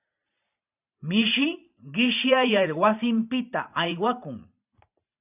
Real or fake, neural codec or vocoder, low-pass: fake; vocoder, 44.1 kHz, 128 mel bands every 512 samples, BigVGAN v2; 3.6 kHz